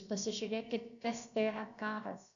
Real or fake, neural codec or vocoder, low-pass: fake; codec, 16 kHz, about 1 kbps, DyCAST, with the encoder's durations; 7.2 kHz